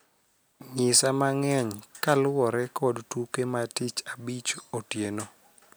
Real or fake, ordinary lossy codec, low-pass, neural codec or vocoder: real; none; none; none